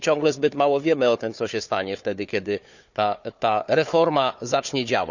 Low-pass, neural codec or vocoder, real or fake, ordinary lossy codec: 7.2 kHz; codec, 16 kHz, 4 kbps, FunCodec, trained on Chinese and English, 50 frames a second; fake; none